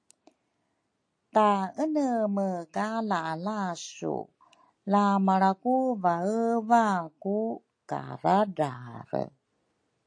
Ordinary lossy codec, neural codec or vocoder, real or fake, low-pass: AAC, 48 kbps; none; real; 9.9 kHz